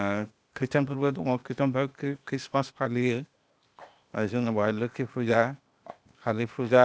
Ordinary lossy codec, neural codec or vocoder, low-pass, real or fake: none; codec, 16 kHz, 0.8 kbps, ZipCodec; none; fake